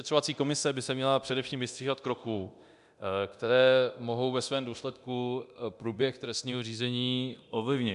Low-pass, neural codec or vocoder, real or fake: 10.8 kHz; codec, 24 kHz, 0.9 kbps, DualCodec; fake